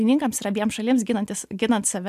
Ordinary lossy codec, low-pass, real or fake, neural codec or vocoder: AAC, 96 kbps; 14.4 kHz; real; none